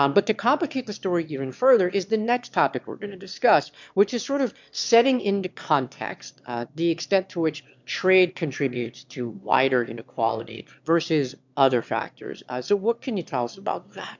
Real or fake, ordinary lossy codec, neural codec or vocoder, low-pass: fake; MP3, 64 kbps; autoencoder, 22.05 kHz, a latent of 192 numbers a frame, VITS, trained on one speaker; 7.2 kHz